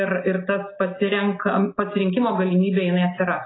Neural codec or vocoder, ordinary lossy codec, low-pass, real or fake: none; AAC, 16 kbps; 7.2 kHz; real